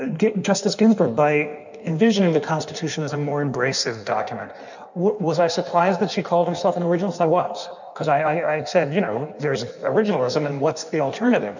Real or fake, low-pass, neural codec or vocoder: fake; 7.2 kHz; codec, 16 kHz in and 24 kHz out, 1.1 kbps, FireRedTTS-2 codec